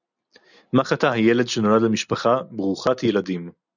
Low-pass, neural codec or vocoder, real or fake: 7.2 kHz; none; real